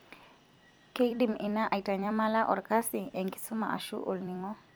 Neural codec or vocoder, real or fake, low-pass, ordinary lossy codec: vocoder, 44.1 kHz, 128 mel bands every 256 samples, BigVGAN v2; fake; none; none